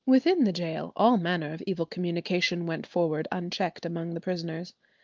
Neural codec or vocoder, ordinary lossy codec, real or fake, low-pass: none; Opus, 32 kbps; real; 7.2 kHz